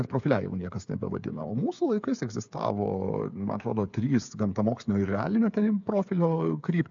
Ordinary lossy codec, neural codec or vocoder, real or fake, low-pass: AAC, 64 kbps; codec, 16 kHz, 8 kbps, FreqCodec, smaller model; fake; 7.2 kHz